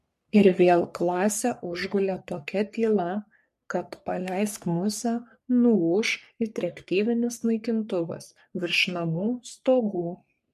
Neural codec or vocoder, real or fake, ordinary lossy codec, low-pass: codec, 44.1 kHz, 3.4 kbps, Pupu-Codec; fake; MP3, 64 kbps; 14.4 kHz